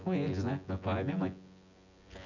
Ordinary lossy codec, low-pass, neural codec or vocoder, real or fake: none; 7.2 kHz; vocoder, 24 kHz, 100 mel bands, Vocos; fake